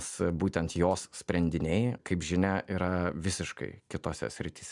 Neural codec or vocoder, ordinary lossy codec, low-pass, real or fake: none; MP3, 96 kbps; 10.8 kHz; real